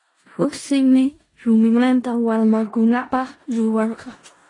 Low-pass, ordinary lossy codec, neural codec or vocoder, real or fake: 10.8 kHz; AAC, 32 kbps; codec, 16 kHz in and 24 kHz out, 0.4 kbps, LongCat-Audio-Codec, four codebook decoder; fake